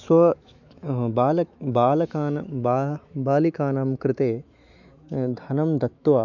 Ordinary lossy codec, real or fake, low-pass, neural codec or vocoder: none; fake; 7.2 kHz; autoencoder, 48 kHz, 128 numbers a frame, DAC-VAE, trained on Japanese speech